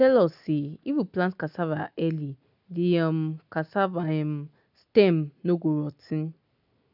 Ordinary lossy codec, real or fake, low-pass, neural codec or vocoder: none; real; 5.4 kHz; none